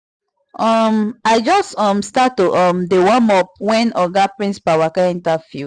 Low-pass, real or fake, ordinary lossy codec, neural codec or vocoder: none; real; none; none